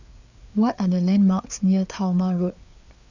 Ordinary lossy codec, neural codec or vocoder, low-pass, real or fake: none; codec, 16 kHz, 4 kbps, FreqCodec, larger model; 7.2 kHz; fake